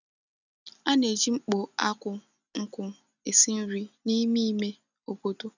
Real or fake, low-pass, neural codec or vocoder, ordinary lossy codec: real; 7.2 kHz; none; none